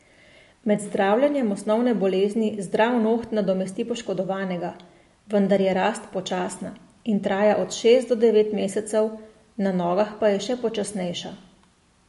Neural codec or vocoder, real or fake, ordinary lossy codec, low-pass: none; real; MP3, 48 kbps; 14.4 kHz